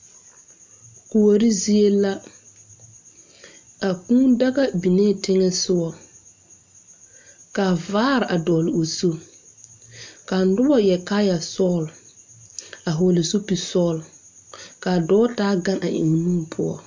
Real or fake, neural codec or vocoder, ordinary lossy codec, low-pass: real; none; MP3, 64 kbps; 7.2 kHz